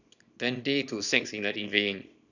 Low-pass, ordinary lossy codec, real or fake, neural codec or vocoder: 7.2 kHz; none; fake; codec, 24 kHz, 0.9 kbps, WavTokenizer, small release